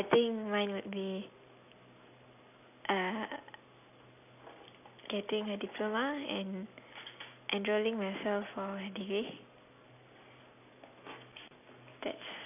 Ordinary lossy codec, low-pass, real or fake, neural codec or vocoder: none; 3.6 kHz; real; none